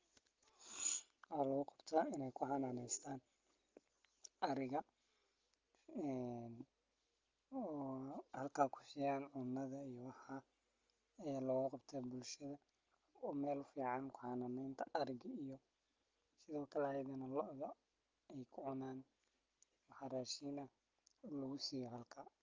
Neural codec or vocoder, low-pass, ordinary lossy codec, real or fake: none; 7.2 kHz; Opus, 32 kbps; real